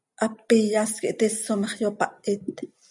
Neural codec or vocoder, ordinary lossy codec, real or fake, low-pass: none; MP3, 96 kbps; real; 10.8 kHz